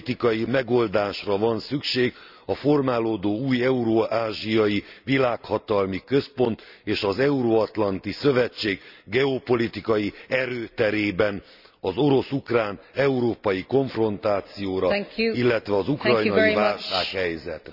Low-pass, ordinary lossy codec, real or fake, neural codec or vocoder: 5.4 kHz; none; real; none